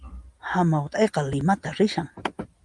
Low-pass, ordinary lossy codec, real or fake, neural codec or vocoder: 10.8 kHz; Opus, 32 kbps; real; none